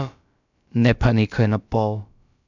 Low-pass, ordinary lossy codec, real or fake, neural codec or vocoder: 7.2 kHz; none; fake; codec, 16 kHz, about 1 kbps, DyCAST, with the encoder's durations